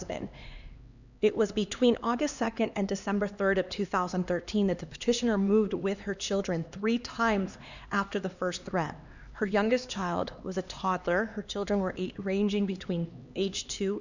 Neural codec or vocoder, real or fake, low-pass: codec, 16 kHz, 2 kbps, X-Codec, HuBERT features, trained on LibriSpeech; fake; 7.2 kHz